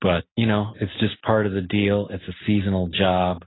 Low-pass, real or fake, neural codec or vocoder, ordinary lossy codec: 7.2 kHz; real; none; AAC, 16 kbps